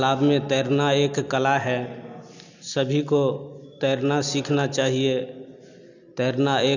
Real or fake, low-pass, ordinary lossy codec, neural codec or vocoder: real; 7.2 kHz; none; none